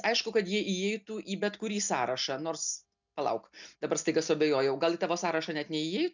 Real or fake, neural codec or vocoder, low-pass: real; none; 7.2 kHz